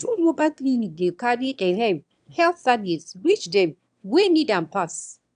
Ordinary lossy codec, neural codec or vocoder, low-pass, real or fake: AAC, 96 kbps; autoencoder, 22.05 kHz, a latent of 192 numbers a frame, VITS, trained on one speaker; 9.9 kHz; fake